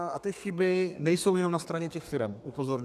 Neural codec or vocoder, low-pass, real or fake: codec, 32 kHz, 1.9 kbps, SNAC; 14.4 kHz; fake